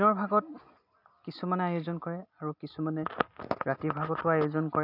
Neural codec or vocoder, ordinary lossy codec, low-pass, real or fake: none; none; 5.4 kHz; real